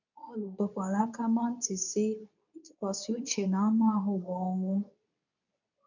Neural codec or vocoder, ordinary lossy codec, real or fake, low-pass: codec, 24 kHz, 0.9 kbps, WavTokenizer, medium speech release version 2; none; fake; 7.2 kHz